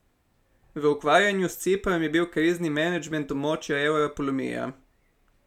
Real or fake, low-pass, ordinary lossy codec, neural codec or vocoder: real; 19.8 kHz; none; none